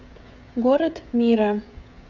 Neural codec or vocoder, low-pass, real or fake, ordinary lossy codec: codec, 16 kHz, 16 kbps, FreqCodec, smaller model; 7.2 kHz; fake; none